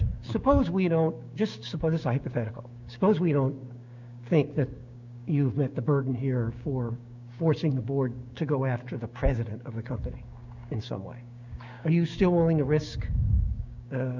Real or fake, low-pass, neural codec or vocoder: fake; 7.2 kHz; codec, 16 kHz, 6 kbps, DAC